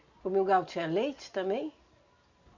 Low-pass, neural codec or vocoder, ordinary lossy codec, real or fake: 7.2 kHz; none; Opus, 64 kbps; real